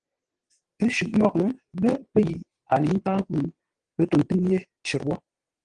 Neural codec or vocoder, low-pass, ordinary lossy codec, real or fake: vocoder, 22.05 kHz, 80 mel bands, WaveNeXt; 9.9 kHz; Opus, 24 kbps; fake